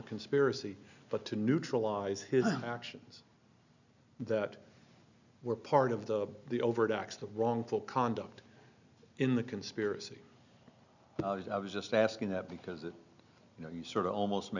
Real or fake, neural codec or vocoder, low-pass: real; none; 7.2 kHz